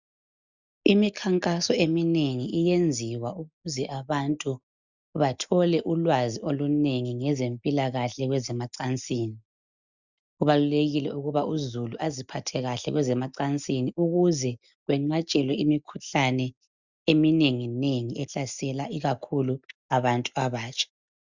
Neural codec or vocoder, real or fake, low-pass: none; real; 7.2 kHz